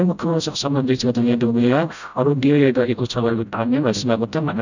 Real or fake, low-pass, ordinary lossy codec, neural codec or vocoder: fake; 7.2 kHz; none; codec, 16 kHz, 0.5 kbps, FreqCodec, smaller model